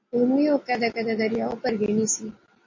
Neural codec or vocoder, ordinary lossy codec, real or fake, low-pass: none; MP3, 32 kbps; real; 7.2 kHz